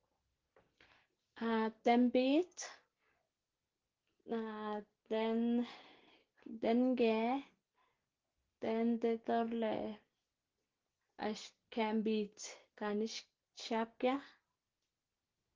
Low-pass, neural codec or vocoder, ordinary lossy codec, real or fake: 7.2 kHz; none; Opus, 16 kbps; real